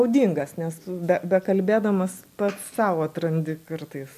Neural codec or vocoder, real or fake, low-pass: none; real; 14.4 kHz